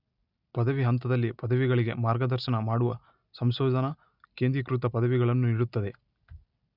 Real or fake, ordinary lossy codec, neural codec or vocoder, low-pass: real; none; none; 5.4 kHz